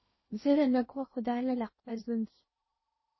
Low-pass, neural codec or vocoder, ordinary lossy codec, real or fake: 7.2 kHz; codec, 16 kHz in and 24 kHz out, 0.6 kbps, FocalCodec, streaming, 2048 codes; MP3, 24 kbps; fake